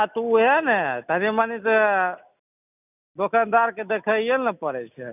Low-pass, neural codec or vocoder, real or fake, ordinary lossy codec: 3.6 kHz; none; real; none